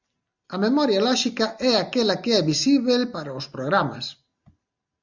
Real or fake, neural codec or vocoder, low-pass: real; none; 7.2 kHz